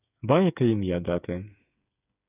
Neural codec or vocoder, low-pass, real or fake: codec, 16 kHz, 8 kbps, FreqCodec, smaller model; 3.6 kHz; fake